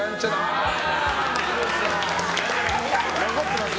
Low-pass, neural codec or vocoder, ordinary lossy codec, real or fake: none; none; none; real